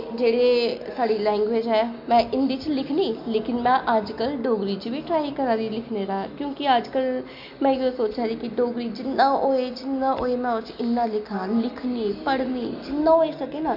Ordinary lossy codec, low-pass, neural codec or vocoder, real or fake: MP3, 48 kbps; 5.4 kHz; none; real